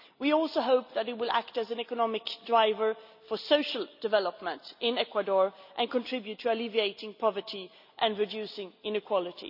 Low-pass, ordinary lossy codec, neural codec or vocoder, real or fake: 5.4 kHz; none; none; real